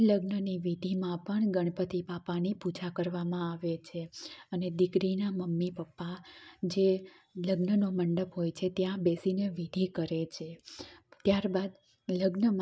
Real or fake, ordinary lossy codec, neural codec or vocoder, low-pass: real; none; none; none